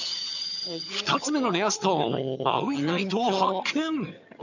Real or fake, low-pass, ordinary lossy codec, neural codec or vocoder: fake; 7.2 kHz; none; vocoder, 22.05 kHz, 80 mel bands, HiFi-GAN